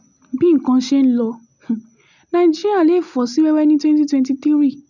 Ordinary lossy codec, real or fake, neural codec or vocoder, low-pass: none; real; none; 7.2 kHz